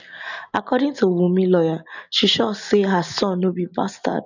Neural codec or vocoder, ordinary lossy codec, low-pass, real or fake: none; none; 7.2 kHz; real